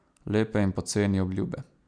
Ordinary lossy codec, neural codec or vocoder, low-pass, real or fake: none; none; 9.9 kHz; real